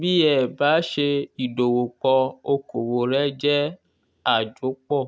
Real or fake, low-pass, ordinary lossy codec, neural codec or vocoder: real; none; none; none